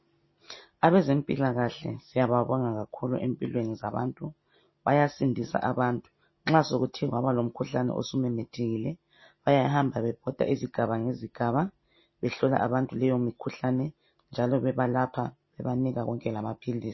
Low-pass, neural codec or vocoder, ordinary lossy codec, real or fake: 7.2 kHz; none; MP3, 24 kbps; real